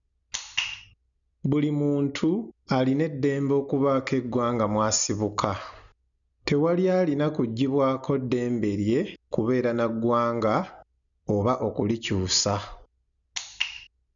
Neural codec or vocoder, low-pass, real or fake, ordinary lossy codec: none; 7.2 kHz; real; none